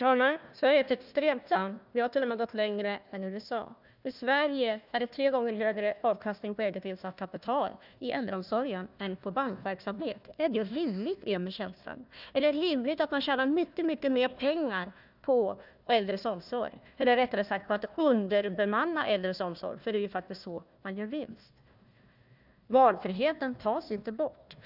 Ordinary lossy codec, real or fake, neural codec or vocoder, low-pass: none; fake; codec, 16 kHz, 1 kbps, FunCodec, trained on Chinese and English, 50 frames a second; 5.4 kHz